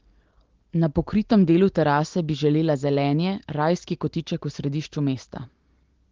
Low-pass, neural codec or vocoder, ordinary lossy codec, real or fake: 7.2 kHz; none; Opus, 16 kbps; real